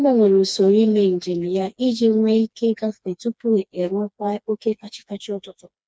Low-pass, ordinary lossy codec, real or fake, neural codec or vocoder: none; none; fake; codec, 16 kHz, 2 kbps, FreqCodec, smaller model